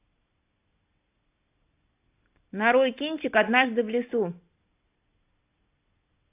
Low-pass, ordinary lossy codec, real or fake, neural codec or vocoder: 3.6 kHz; AAC, 24 kbps; real; none